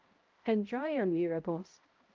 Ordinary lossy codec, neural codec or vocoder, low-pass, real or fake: Opus, 24 kbps; codec, 16 kHz, 0.5 kbps, X-Codec, HuBERT features, trained on balanced general audio; 7.2 kHz; fake